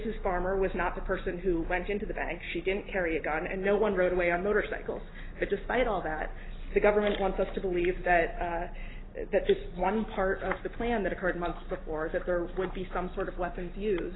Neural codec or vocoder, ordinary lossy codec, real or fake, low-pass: none; AAC, 16 kbps; real; 7.2 kHz